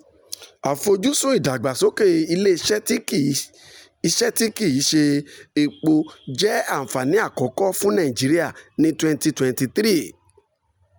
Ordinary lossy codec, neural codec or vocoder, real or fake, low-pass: none; none; real; none